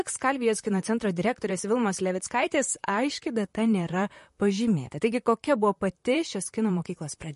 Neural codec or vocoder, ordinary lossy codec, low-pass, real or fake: vocoder, 44.1 kHz, 128 mel bands, Pupu-Vocoder; MP3, 48 kbps; 14.4 kHz; fake